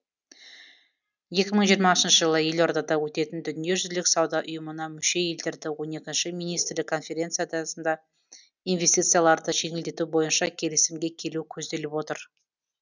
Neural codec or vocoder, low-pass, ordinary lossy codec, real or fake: none; 7.2 kHz; none; real